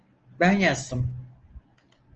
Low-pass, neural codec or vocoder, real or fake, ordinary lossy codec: 7.2 kHz; none; real; Opus, 24 kbps